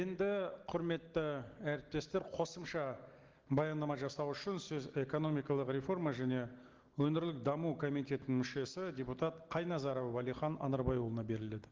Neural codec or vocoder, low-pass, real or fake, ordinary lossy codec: none; 7.2 kHz; real; Opus, 16 kbps